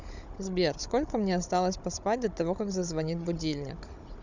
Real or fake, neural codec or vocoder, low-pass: fake; codec, 16 kHz, 16 kbps, FunCodec, trained on Chinese and English, 50 frames a second; 7.2 kHz